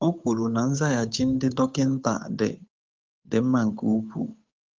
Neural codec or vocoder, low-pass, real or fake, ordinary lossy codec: codec, 16 kHz, 8 kbps, FunCodec, trained on Chinese and English, 25 frames a second; 7.2 kHz; fake; Opus, 32 kbps